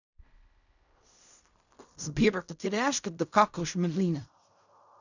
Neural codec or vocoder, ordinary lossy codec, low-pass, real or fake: codec, 16 kHz in and 24 kHz out, 0.4 kbps, LongCat-Audio-Codec, fine tuned four codebook decoder; none; 7.2 kHz; fake